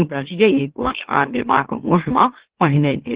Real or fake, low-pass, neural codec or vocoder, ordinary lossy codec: fake; 3.6 kHz; autoencoder, 44.1 kHz, a latent of 192 numbers a frame, MeloTTS; Opus, 16 kbps